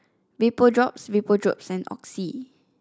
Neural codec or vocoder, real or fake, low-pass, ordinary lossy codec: none; real; none; none